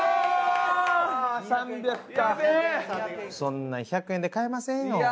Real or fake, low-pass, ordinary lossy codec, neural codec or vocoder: real; none; none; none